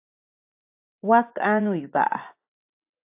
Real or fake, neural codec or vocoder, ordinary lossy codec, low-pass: fake; vocoder, 24 kHz, 100 mel bands, Vocos; AAC, 32 kbps; 3.6 kHz